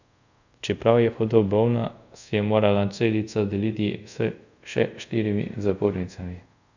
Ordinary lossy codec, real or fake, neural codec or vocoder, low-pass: none; fake; codec, 24 kHz, 0.5 kbps, DualCodec; 7.2 kHz